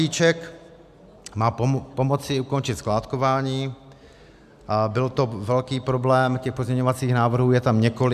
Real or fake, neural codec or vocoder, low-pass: real; none; 14.4 kHz